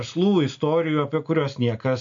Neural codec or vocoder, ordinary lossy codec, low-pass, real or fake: none; MP3, 64 kbps; 7.2 kHz; real